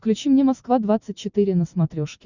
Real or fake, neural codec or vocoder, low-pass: real; none; 7.2 kHz